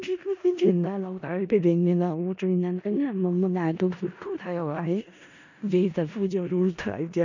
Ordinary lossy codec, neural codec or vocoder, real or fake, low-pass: none; codec, 16 kHz in and 24 kHz out, 0.4 kbps, LongCat-Audio-Codec, four codebook decoder; fake; 7.2 kHz